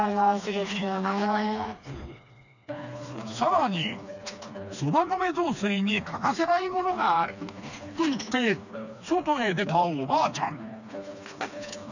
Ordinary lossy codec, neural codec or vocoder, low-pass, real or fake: none; codec, 16 kHz, 2 kbps, FreqCodec, smaller model; 7.2 kHz; fake